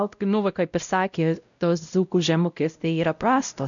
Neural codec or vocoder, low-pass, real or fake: codec, 16 kHz, 0.5 kbps, X-Codec, WavLM features, trained on Multilingual LibriSpeech; 7.2 kHz; fake